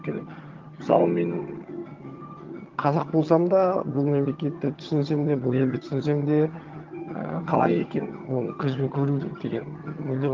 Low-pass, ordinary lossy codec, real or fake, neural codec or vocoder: 7.2 kHz; Opus, 32 kbps; fake; vocoder, 22.05 kHz, 80 mel bands, HiFi-GAN